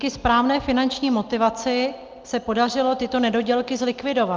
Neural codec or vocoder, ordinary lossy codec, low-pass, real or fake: none; Opus, 32 kbps; 7.2 kHz; real